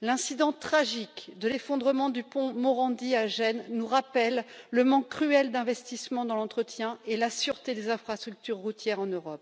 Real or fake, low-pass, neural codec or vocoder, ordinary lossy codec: real; none; none; none